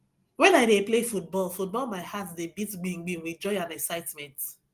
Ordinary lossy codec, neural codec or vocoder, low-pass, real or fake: Opus, 24 kbps; none; 14.4 kHz; real